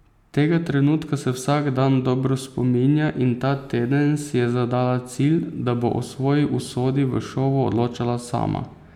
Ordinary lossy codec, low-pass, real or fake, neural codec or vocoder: none; 19.8 kHz; real; none